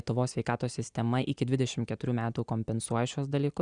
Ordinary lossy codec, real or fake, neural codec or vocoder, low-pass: Opus, 64 kbps; real; none; 9.9 kHz